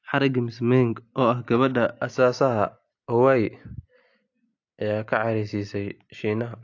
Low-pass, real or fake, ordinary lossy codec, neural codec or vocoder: 7.2 kHz; real; AAC, 48 kbps; none